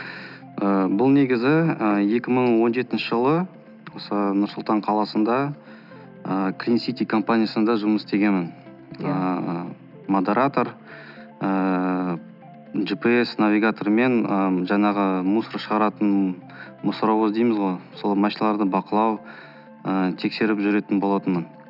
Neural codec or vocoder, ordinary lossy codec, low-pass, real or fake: none; none; 5.4 kHz; real